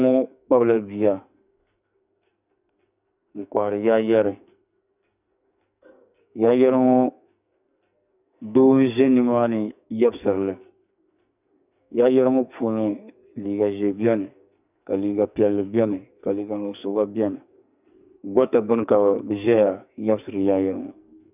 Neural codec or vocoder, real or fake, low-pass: codec, 44.1 kHz, 2.6 kbps, SNAC; fake; 3.6 kHz